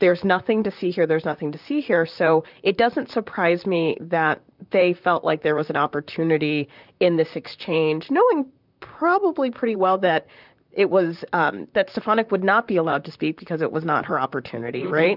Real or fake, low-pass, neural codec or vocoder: fake; 5.4 kHz; vocoder, 44.1 kHz, 128 mel bands, Pupu-Vocoder